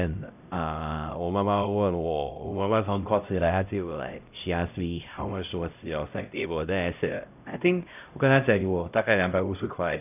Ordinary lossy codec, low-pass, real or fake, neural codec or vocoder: none; 3.6 kHz; fake; codec, 16 kHz, 0.5 kbps, X-Codec, HuBERT features, trained on LibriSpeech